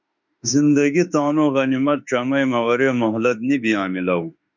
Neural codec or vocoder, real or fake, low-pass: autoencoder, 48 kHz, 32 numbers a frame, DAC-VAE, trained on Japanese speech; fake; 7.2 kHz